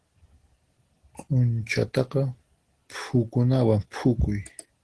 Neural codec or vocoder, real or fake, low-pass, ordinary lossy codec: none; real; 10.8 kHz; Opus, 16 kbps